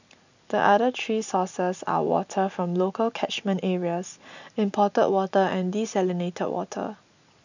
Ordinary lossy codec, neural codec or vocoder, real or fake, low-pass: none; none; real; 7.2 kHz